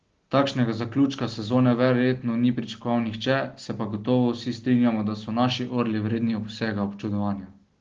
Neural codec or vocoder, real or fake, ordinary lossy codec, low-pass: none; real; Opus, 16 kbps; 7.2 kHz